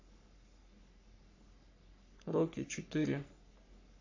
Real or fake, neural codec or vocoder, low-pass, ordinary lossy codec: fake; codec, 44.1 kHz, 3.4 kbps, Pupu-Codec; 7.2 kHz; AAC, 48 kbps